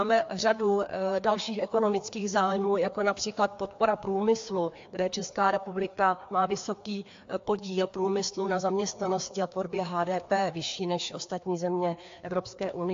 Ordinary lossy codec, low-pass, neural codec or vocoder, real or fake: AAC, 48 kbps; 7.2 kHz; codec, 16 kHz, 2 kbps, FreqCodec, larger model; fake